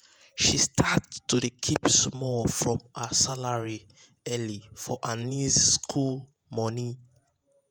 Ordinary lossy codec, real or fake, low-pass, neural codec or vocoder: none; fake; none; vocoder, 48 kHz, 128 mel bands, Vocos